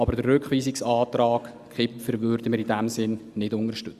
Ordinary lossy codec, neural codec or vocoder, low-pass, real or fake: Opus, 64 kbps; none; 14.4 kHz; real